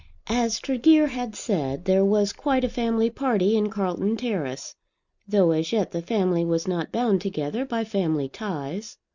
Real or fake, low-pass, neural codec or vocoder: real; 7.2 kHz; none